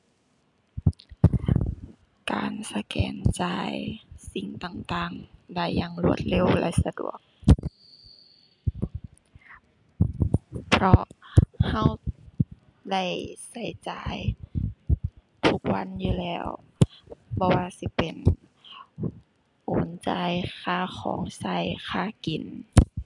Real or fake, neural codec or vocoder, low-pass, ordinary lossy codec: real; none; 10.8 kHz; MP3, 96 kbps